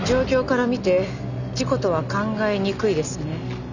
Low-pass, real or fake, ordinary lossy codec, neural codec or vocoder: 7.2 kHz; real; none; none